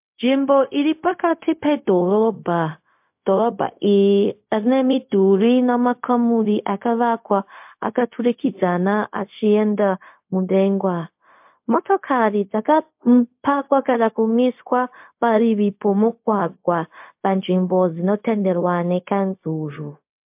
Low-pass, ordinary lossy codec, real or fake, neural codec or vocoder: 3.6 kHz; MP3, 32 kbps; fake; codec, 16 kHz, 0.4 kbps, LongCat-Audio-Codec